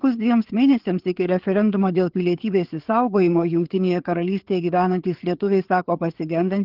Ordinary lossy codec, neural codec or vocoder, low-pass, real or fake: Opus, 16 kbps; codec, 16 kHz, 4 kbps, FreqCodec, larger model; 5.4 kHz; fake